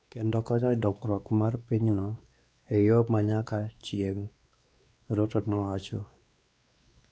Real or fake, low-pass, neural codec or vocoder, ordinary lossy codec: fake; none; codec, 16 kHz, 2 kbps, X-Codec, WavLM features, trained on Multilingual LibriSpeech; none